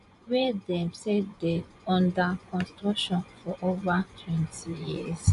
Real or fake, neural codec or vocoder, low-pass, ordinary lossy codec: fake; vocoder, 24 kHz, 100 mel bands, Vocos; 10.8 kHz; none